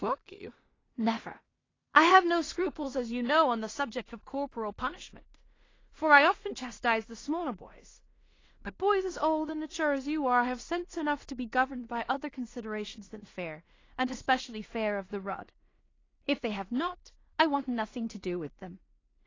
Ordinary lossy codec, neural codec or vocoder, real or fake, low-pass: AAC, 32 kbps; codec, 16 kHz in and 24 kHz out, 0.4 kbps, LongCat-Audio-Codec, two codebook decoder; fake; 7.2 kHz